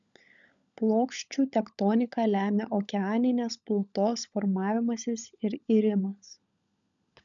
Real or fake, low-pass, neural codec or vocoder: fake; 7.2 kHz; codec, 16 kHz, 16 kbps, FunCodec, trained on LibriTTS, 50 frames a second